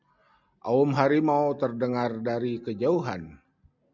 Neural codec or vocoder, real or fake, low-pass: none; real; 7.2 kHz